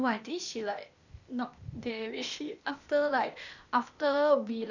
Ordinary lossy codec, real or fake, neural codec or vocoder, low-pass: none; fake; codec, 16 kHz, 0.8 kbps, ZipCodec; 7.2 kHz